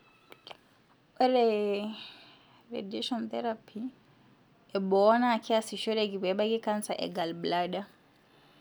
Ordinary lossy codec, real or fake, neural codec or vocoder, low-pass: none; real; none; none